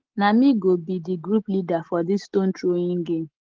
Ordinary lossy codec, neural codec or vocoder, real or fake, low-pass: Opus, 16 kbps; none; real; 7.2 kHz